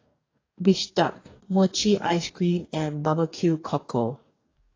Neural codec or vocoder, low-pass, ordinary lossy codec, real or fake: codec, 44.1 kHz, 2.6 kbps, DAC; 7.2 kHz; AAC, 32 kbps; fake